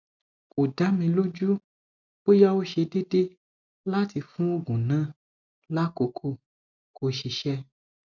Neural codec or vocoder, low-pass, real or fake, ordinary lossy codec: none; 7.2 kHz; real; none